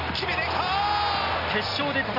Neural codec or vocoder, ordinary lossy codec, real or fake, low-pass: none; none; real; 5.4 kHz